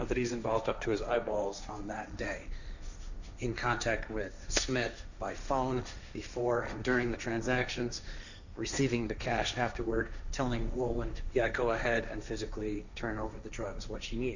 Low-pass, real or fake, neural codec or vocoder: 7.2 kHz; fake; codec, 16 kHz, 1.1 kbps, Voila-Tokenizer